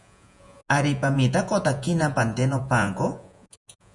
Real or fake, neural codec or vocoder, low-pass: fake; vocoder, 48 kHz, 128 mel bands, Vocos; 10.8 kHz